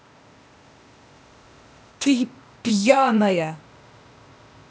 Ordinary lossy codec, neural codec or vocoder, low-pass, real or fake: none; codec, 16 kHz, 0.8 kbps, ZipCodec; none; fake